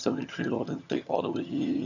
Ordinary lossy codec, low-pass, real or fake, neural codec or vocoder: none; 7.2 kHz; fake; vocoder, 22.05 kHz, 80 mel bands, HiFi-GAN